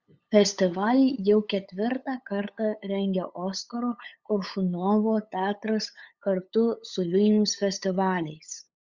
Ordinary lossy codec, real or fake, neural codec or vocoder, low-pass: Opus, 64 kbps; fake; codec, 16 kHz, 8 kbps, FunCodec, trained on LibriTTS, 25 frames a second; 7.2 kHz